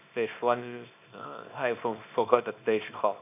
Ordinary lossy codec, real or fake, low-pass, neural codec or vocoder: none; fake; 3.6 kHz; codec, 24 kHz, 0.9 kbps, WavTokenizer, small release